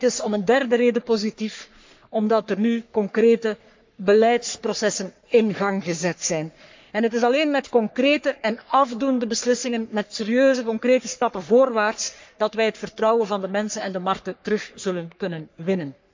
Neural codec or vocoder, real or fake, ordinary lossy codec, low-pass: codec, 44.1 kHz, 3.4 kbps, Pupu-Codec; fake; MP3, 64 kbps; 7.2 kHz